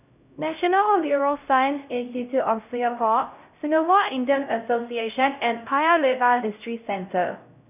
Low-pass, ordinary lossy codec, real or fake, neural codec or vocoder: 3.6 kHz; none; fake; codec, 16 kHz, 0.5 kbps, X-Codec, HuBERT features, trained on LibriSpeech